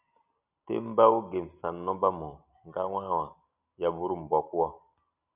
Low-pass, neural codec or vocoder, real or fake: 3.6 kHz; none; real